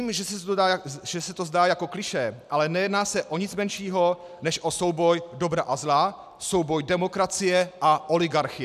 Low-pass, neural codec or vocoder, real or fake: 14.4 kHz; none; real